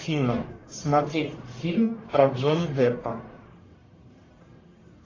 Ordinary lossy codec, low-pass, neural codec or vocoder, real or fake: AAC, 32 kbps; 7.2 kHz; codec, 44.1 kHz, 1.7 kbps, Pupu-Codec; fake